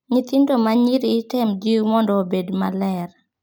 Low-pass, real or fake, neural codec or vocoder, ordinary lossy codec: none; real; none; none